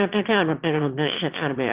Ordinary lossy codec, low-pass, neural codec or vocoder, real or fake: Opus, 16 kbps; 3.6 kHz; autoencoder, 22.05 kHz, a latent of 192 numbers a frame, VITS, trained on one speaker; fake